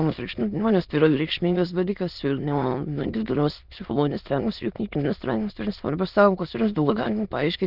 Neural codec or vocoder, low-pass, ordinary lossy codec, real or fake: autoencoder, 22.05 kHz, a latent of 192 numbers a frame, VITS, trained on many speakers; 5.4 kHz; Opus, 24 kbps; fake